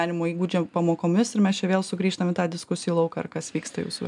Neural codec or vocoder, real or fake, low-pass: none; real; 10.8 kHz